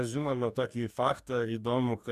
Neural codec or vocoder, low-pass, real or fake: codec, 44.1 kHz, 2.6 kbps, DAC; 14.4 kHz; fake